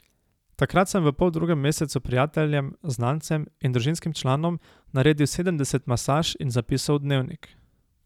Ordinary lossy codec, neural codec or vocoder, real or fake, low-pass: none; none; real; 19.8 kHz